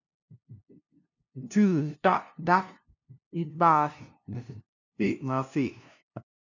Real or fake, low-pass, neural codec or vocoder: fake; 7.2 kHz; codec, 16 kHz, 0.5 kbps, FunCodec, trained on LibriTTS, 25 frames a second